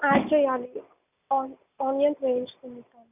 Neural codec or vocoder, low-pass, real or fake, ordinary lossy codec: none; 3.6 kHz; real; none